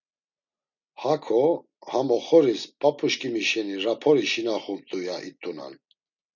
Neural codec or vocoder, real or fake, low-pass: none; real; 7.2 kHz